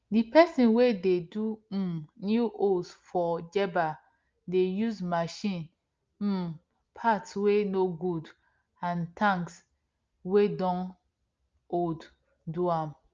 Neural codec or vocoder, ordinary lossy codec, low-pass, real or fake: none; Opus, 24 kbps; 7.2 kHz; real